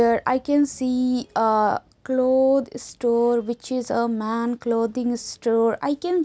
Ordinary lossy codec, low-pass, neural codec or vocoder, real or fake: none; none; none; real